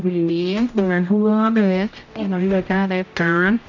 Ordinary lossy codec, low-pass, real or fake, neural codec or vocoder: none; 7.2 kHz; fake; codec, 16 kHz, 0.5 kbps, X-Codec, HuBERT features, trained on general audio